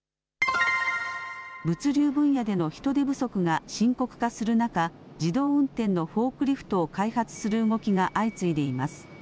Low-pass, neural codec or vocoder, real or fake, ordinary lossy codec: none; none; real; none